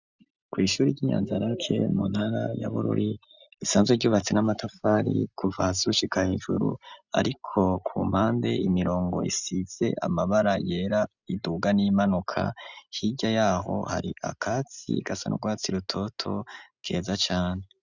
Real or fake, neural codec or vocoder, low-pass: real; none; 7.2 kHz